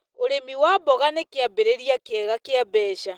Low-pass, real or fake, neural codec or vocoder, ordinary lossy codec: 19.8 kHz; real; none; Opus, 16 kbps